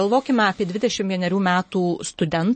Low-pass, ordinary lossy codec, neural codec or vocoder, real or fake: 10.8 kHz; MP3, 32 kbps; none; real